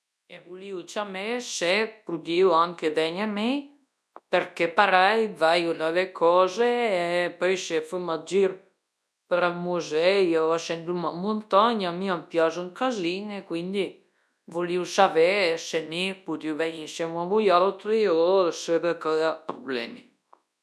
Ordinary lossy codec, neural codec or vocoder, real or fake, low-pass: none; codec, 24 kHz, 0.9 kbps, WavTokenizer, large speech release; fake; none